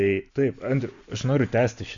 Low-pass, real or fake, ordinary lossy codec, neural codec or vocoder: 7.2 kHz; real; Opus, 64 kbps; none